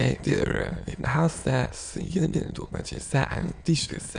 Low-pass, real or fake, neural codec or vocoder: 9.9 kHz; fake; autoencoder, 22.05 kHz, a latent of 192 numbers a frame, VITS, trained on many speakers